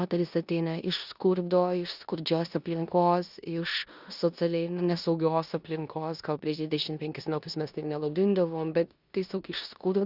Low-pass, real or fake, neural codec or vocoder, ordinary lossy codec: 5.4 kHz; fake; codec, 16 kHz in and 24 kHz out, 0.9 kbps, LongCat-Audio-Codec, four codebook decoder; Opus, 64 kbps